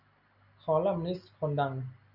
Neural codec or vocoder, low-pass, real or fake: none; 5.4 kHz; real